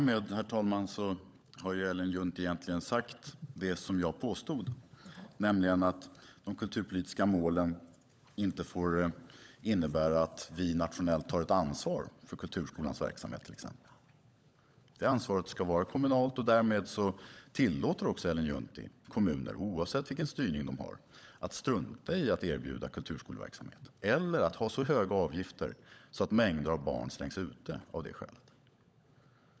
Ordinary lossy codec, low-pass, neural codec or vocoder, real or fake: none; none; codec, 16 kHz, 16 kbps, FunCodec, trained on LibriTTS, 50 frames a second; fake